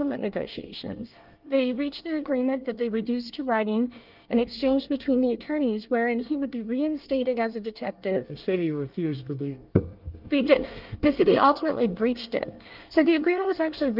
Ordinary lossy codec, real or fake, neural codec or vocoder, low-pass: Opus, 24 kbps; fake; codec, 24 kHz, 1 kbps, SNAC; 5.4 kHz